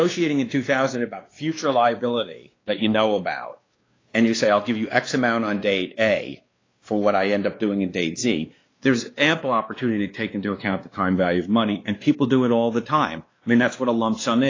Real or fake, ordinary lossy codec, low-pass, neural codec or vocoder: fake; AAC, 32 kbps; 7.2 kHz; codec, 16 kHz, 2 kbps, X-Codec, WavLM features, trained on Multilingual LibriSpeech